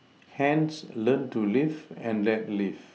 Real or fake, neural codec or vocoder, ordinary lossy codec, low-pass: real; none; none; none